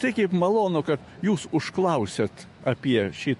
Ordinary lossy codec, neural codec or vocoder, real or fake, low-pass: MP3, 48 kbps; autoencoder, 48 kHz, 128 numbers a frame, DAC-VAE, trained on Japanese speech; fake; 14.4 kHz